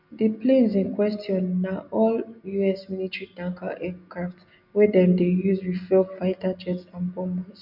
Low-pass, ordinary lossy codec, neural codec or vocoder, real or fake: 5.4 kHz; none; none; real